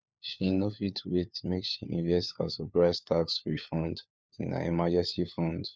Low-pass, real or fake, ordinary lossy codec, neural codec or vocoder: none; fake; none; codec, 16 kHz, 4 kbps, FunCodec, trained on LibriTTS, 50 frames a second